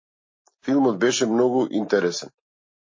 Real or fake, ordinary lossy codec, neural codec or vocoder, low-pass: real; MP3, 32 kbps; none; 7.2 kHz